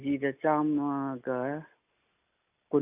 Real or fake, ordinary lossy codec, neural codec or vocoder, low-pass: real; none; none; 3.6 kHz